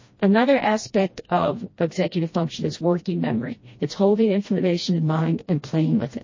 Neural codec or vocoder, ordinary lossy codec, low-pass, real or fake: codec, 16 kHz, 1 kbps, FreqCodec, smaller model; MP3, 32 kbps; 7.2 kHz; fake